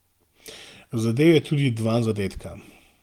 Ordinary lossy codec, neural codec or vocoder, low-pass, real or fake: Opus, 24 kbps; none; 19.8 kHz; real